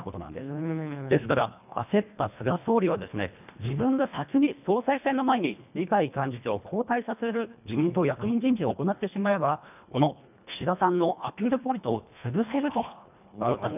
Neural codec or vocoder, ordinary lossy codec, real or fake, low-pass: codec, 24 kHz, 1.5 kbps, HILCodec; none; fake; 3.6 kHz